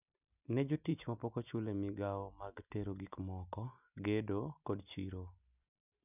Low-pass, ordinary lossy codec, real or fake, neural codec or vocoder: 3.6 kHz; none; real; none